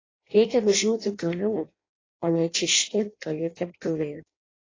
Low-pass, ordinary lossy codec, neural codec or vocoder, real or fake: 7.2 kHz; AAC, 32 kbps; codec, 16 kHz in and 24 kHz out, 0.6 kbps, FireRedTTS-2 codec; fake